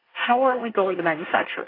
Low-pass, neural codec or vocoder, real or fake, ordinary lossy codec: 5.4 kHz; codec, 24 kHz, 1 kbps, SNAC; fake; AAC, 24 kbps